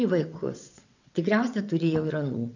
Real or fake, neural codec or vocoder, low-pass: fake; vocoder, 22.05 kHz, 80 mel bands, WaveNeXt; 7.2 kHz